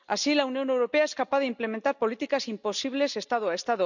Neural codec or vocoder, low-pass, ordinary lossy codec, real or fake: none; 7.2 kHz; none; real